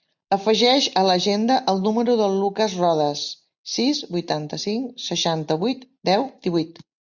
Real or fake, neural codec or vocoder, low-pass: real; none; 7.2 kHz